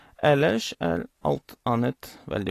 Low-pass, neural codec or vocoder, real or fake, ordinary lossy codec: 14.4 kHz; none; real; AAC, 48 kbps